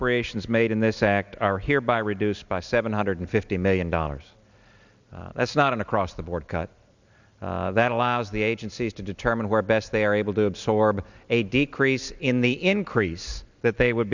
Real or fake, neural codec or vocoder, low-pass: real; none; 7.2 kHz